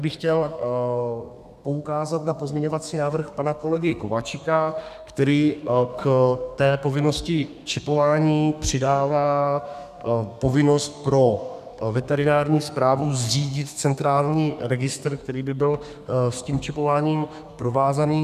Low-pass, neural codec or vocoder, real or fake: 14.4 kHz; codec, 32 kHz, 1.9 kbps, SNAC; fake